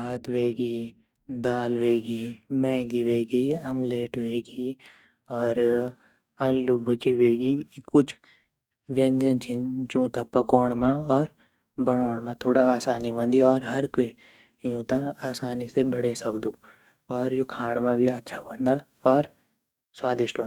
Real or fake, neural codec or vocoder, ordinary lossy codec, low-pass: fake; codec, 44.1 kHz, 2.6 kbps, DAC; none; 19.8 kHz